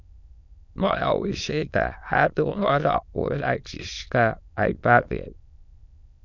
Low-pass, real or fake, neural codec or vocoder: 7.2 kHz; fake; autoencoder, 22.05 kHz, a latent of 192 numbers a frame, VITS, trained on many speakers